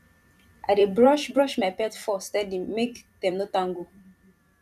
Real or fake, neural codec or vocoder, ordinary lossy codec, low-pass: fake; vocoder, 44.1 kHz, 128 mel bands every 512 samples, BigVGAN v2; none; 14.4 kHz